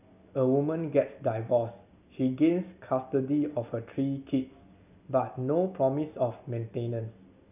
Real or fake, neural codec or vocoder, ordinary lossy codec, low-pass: real; none; none; 3.6 kHz